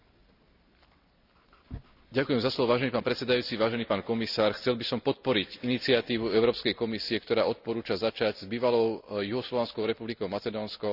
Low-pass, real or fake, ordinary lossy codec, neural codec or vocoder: 5.4 kHz; real; none; none